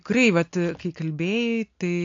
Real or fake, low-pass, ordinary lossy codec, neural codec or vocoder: real; 7.2 kHz; AAC, 48 kbps; none